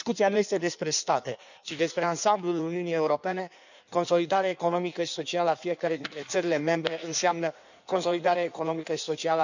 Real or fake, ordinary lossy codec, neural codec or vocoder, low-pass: fake; none; codec, 16 kHz in and 24 kHz out, 1.1 kbps, FireRedTTS-2 codec; 7.2 kHz